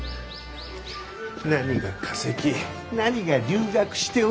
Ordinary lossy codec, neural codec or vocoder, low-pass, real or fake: none; none; none; real